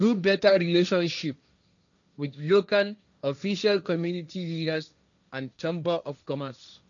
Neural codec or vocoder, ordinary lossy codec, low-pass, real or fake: codec, 16 kHz, 1.1 kbps, Voila-Tokenizer; none; 7.2 kHz; fake